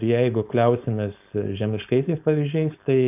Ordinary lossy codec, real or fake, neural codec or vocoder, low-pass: AAC, 32 kbps; fake; codec, 16 kHz, 4.8 kbps, FACodec; 3.6 kHz